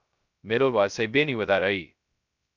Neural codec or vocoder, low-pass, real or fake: codec, 16 kHz, 0.2 kbps, FocalCodec; 7.2 kHz; fake